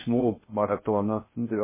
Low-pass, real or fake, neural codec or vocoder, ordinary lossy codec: 3.6 kHz; fake; codec, 16 kHz in and 24 kHz out, 0.6 kbps, FocalCodec, streaming, 2048 codes; MP3, 16 kbps